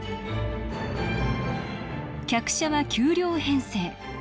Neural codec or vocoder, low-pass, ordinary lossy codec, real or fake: none; none; none; real